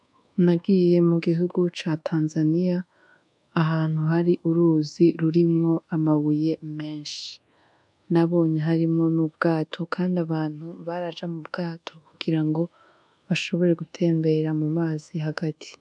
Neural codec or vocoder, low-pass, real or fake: codec, 24 kHz, 1.2 kbps, DualCodec; 10.8 kHz; fake